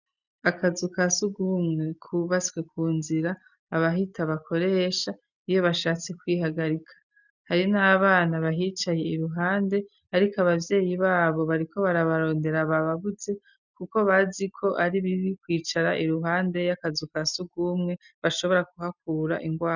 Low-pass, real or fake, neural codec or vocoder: 7.2 kHz; real; none